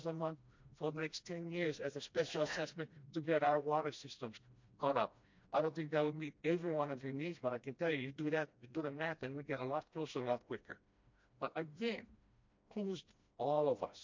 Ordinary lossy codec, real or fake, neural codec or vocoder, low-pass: MP3, 48 kbps; fake; codec, 16 kHz, 1 kbps, FreqCodec, smaller model; 7.2 kHz